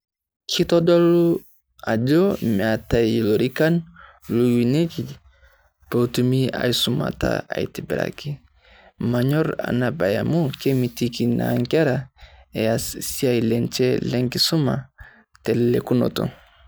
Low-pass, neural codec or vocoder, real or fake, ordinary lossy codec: none; none; real; none